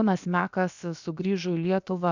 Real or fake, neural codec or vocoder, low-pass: fake; codec, 16 kHz, about 1 kbps, DyCAST, with the encoder's durations; 7.2 kHz